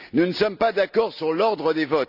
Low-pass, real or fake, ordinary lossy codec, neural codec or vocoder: 5.4 kHz; real; none; none